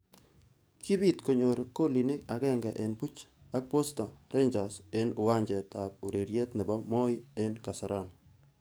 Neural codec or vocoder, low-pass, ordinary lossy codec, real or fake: codec, 44.1 kHz, 7.8 kbps, DAC; none; none; fake